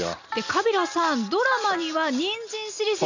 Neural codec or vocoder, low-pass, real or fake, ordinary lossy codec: none; 7.2 kHz; real; none